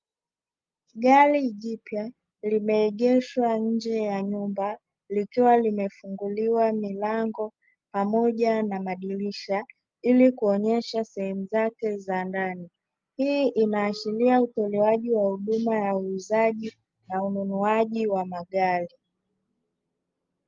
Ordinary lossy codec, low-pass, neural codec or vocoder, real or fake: Opus, 32 kbps; 7.2 kHz; none; real